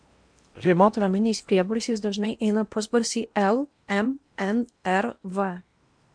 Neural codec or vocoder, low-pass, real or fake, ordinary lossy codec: codec, 16 kHz in and 24 kHz out, 0.8 kbps, FocalCodec, streaming, 65536 codes; 9.9 kHz; fake; MP3, 64 kbps